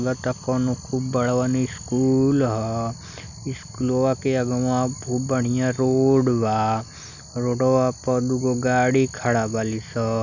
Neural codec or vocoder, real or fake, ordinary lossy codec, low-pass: none; real; none; 7.2 kHz